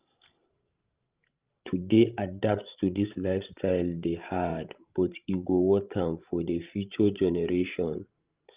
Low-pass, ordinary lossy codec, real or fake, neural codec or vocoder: 3.6 kHz; Opus, 32 kbps; fake; codec, 16 kHz, 16 kbps, FreqCodec, larger model